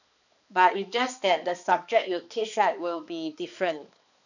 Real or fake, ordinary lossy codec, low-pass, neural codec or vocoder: fake; none; 7.2 kHz; codec, 16 kHz, 2 kbps, X-Codec, HuBERT features, trained on balanced general audio